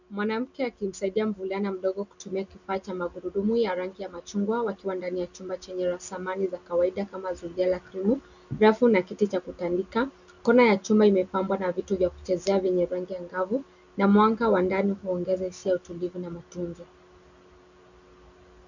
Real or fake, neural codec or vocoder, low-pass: real; none; 7.2 kHz